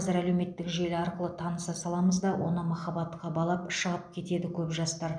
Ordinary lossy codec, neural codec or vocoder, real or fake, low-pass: none; none; real; 9.9 kHz